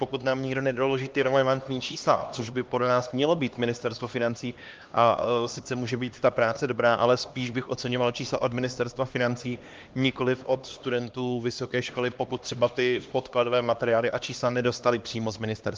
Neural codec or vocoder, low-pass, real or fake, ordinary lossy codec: codec, 16 kHz, 2 kbps, X-Codec, HuBERT features, trained on LibriSpeech; 7.2 kHz; fake; Opus, 32 kbps